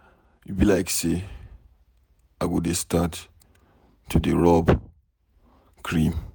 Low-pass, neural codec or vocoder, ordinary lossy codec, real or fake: none; vocoder, 48 kHz, 128 mel bands, Vocos; none; fake